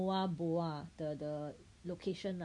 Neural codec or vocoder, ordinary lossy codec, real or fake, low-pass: none; none; real; 10.8 kHz